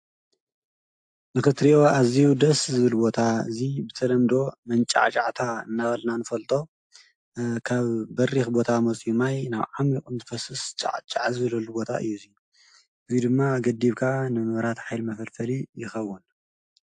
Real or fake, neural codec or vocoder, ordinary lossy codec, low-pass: real; none; AAC, 64 kbps; 10.8 kHz